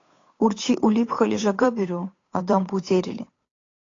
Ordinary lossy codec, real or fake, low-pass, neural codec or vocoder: AAC, 32 kbps; fake; 7.2 kHz; codec, 16 kHz, 8 kbps, FunCodec, trained on Chinese and English, 25 frames a second